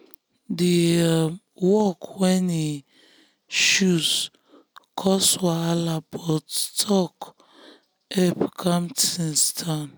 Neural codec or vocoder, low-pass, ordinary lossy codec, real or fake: none; none; none; real